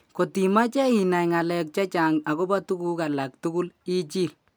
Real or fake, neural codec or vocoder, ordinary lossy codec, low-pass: real; none; none; none